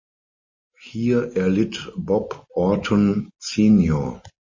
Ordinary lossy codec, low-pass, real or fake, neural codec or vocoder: MP3, 32 kbps; 7.2 kHz; real; none